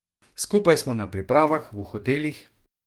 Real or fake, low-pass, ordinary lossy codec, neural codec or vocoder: fake; 19.8 kHz; Opus, 32 kbps; codec, 44.1 kHz, 2.6 kbps, DAC